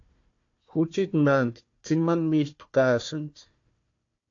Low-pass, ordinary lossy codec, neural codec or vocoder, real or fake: 7.2 kHz; AAC, 48 kbps; codec, 16 kHz, 1 kbps, FunCodec, trained on Chinese and English, 50 frames a second; fake